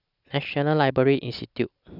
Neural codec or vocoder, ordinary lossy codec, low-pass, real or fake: none; none; 5.4 kHz; real